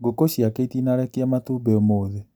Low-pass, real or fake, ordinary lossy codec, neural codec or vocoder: none; real; none; none